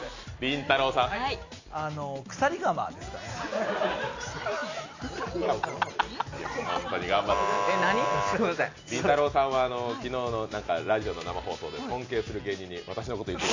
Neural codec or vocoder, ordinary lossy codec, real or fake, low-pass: none; AAC, 48 kbps; real; 7.2 kHz